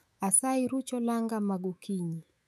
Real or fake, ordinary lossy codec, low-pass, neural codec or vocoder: fake; none; 14.4 kHz; autoencoder, 48 kHz, 128 numbers a frame, DAC-VAE, trained on Japanese speech